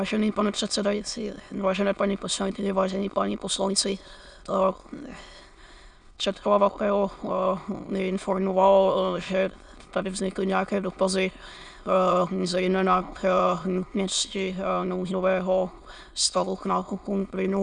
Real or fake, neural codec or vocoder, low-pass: fake; autoencoder, 22.05 kHz, a latent of 192 numbers a frame, VITS, trained on many speakers; 9.9 kHz